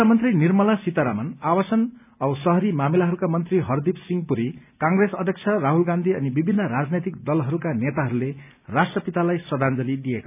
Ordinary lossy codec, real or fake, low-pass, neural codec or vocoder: none; real; 3.6 kHz; none